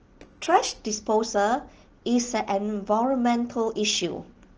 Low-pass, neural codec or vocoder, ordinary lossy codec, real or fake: 7.2 kHz; none; Opus, 16 kbps; real